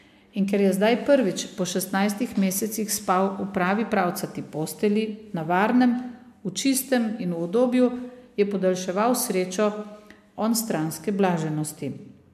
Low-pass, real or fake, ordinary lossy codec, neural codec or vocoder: 14.4 kHz; real; MP3, 96 kbps; none